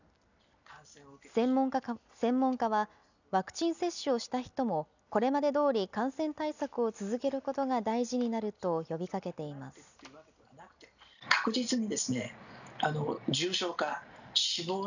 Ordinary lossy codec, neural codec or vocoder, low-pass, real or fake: none; none; 7.2 kHz; real